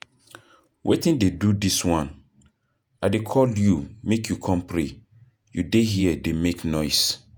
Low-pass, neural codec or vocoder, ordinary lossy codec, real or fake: none; vocoder, 48 kHz, 128 mel bands, Vocos; none; fake